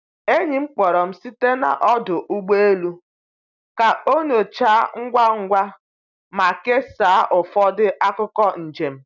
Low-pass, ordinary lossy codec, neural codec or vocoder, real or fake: 7.2 kHz; none; none; real